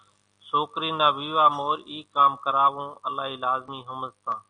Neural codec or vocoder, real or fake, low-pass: none; real; 9.9 kHz